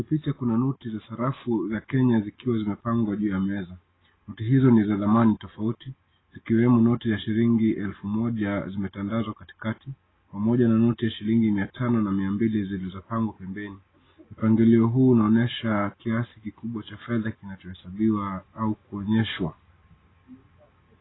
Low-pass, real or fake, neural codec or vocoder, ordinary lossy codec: 7.2 kHz; real; none; AAC, 16 kbps